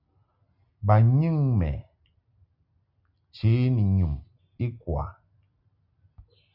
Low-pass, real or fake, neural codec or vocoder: 5.4 kHz; real; none